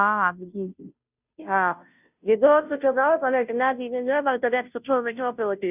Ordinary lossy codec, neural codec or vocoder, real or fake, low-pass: none; codec, 16 kHz, 0.5 kbps, FunCodec, trained on Chinese and English, 25 frames a second; fake; 3.6 kHz